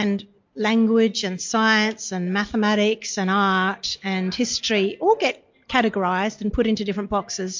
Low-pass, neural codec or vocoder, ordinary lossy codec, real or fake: 7.2 kHz; none; MP3, 48 kbps; real